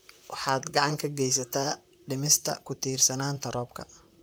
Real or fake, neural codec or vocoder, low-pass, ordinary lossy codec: fake; vocoder, 44.1 kHz, 128 mel bands, Pupu-Vocoder; none; none